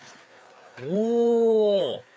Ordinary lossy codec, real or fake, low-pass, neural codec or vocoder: none; fake; none; codec, 16 kHz, 4 kbps, FreqCodec, larger model